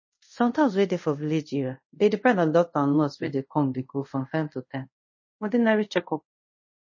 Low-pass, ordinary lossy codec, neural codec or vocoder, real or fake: 7.2 kHz; MP3, 32 kbps; codec, 24 kHz, 0.5 kbps, DualCodec; fake